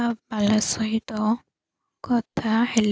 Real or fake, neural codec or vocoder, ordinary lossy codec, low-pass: real; none; none; none